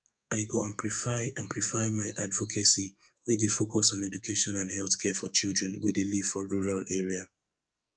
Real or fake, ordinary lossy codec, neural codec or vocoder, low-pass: fake; none; codec, 44.1 kHz, 2.6 kbps, SNAC; 9.9 kHz